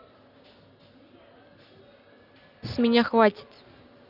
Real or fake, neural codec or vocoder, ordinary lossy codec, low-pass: real; none; none; 5.4 kHz